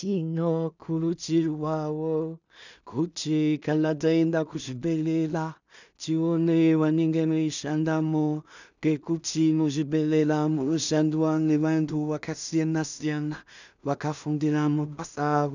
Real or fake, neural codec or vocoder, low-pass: fake; codec, 16 kHz in and 24 kHz out, 0.4 kbps, LongCat-Audio-Codec, two codebook decoder; 7.2 kHz